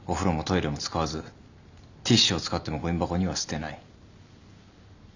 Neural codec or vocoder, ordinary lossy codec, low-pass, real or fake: none; none; 7.2 kHz; real